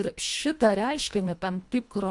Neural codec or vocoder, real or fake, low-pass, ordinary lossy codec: codec, 24 kHz, 1.5 kbps, HILCodec; fake; 10.8 kHz; AAC, 64 kbps